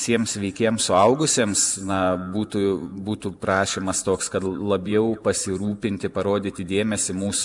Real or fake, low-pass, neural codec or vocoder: fake; 10.8 kHz; vocoder, 44.1 kHz, 128 mel bands every 512 samples, BigVGAN v2